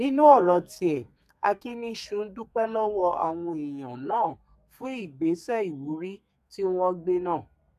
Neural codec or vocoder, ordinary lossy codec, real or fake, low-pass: codec, 32 kHz, 1.9 kbps, SNAC; none; fake; 14.4 kHz